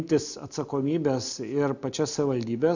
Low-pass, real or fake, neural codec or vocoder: 7.2 kHz; real; none